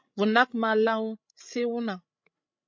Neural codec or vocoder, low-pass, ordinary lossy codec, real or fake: codec, 16 kHz, 16 kbps, FreqCodec, larger model; 7.2 kHz; MP3, 48 kbps; fake